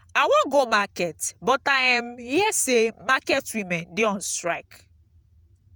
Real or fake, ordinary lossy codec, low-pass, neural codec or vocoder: fake; none; none; vocoder, 48 kHz, 128 mel bands, Vocos